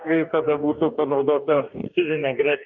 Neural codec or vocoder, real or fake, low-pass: codec, 44.1 kHz, 2.6 kbps, DAC; fake; 7.2 kHz